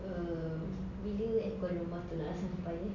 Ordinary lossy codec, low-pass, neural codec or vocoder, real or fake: none; 7.2 kHz; none; real